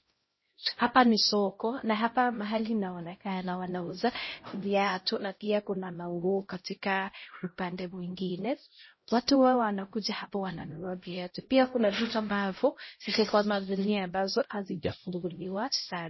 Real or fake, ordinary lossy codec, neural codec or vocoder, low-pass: fake; MP3, 24 kbps; codec, 16 kHz, 0.5 kbps, X-Codec, HuBERT features, trained on LibriSpeech; 7.2 kHz